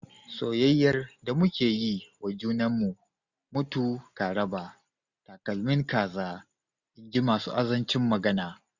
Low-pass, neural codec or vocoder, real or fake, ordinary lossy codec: 7.2 kHz; none; real; none